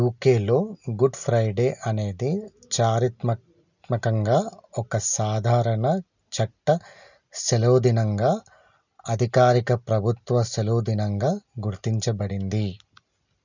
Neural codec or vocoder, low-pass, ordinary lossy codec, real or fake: none; 7.2 kHz; none; real